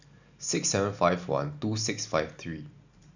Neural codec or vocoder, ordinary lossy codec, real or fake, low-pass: none; none; real; 7.2 kHz